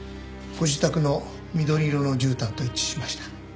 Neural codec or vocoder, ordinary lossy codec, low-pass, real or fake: none; none; none; real